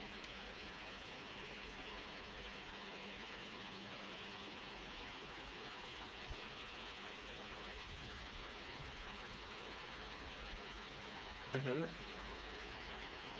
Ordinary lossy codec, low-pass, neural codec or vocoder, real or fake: none; none; codec, 16 kHz, 4 kbps, FreqCodec, smaller model; fake